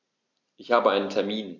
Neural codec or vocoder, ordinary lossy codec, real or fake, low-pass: none; none; real; 7.2 kHz